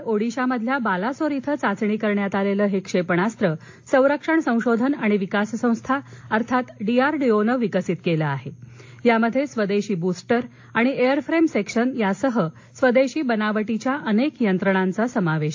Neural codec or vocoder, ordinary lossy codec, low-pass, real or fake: none; AAC, 48 kbps; 7.2 kHz; real